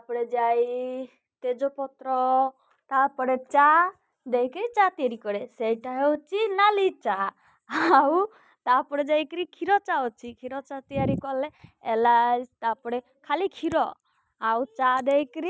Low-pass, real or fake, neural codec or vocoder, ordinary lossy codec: none; real; none; none